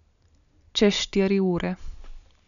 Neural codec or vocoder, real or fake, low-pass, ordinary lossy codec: none; real; 7.2 kHz; none